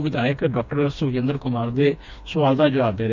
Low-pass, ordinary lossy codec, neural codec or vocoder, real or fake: 7.2 kHz; none; codec, 16 kHz, 2 kbps, FreqCodec, smaller model; fake